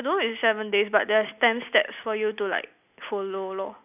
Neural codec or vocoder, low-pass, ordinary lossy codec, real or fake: none; 3.6 kHz; none; real